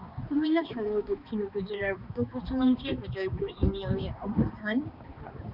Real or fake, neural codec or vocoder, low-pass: fake; codec, 16 kHz, 2 kbps, X-Codec, HuBERT features, trained on balanced general audio; 5.4 kHz